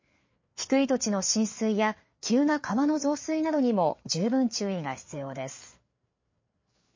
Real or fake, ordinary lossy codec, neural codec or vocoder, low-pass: fake; MP3, 32 kbps; codec, 16 kHz, 4 kbps, FreqCodec, larger model; 7.2 kHz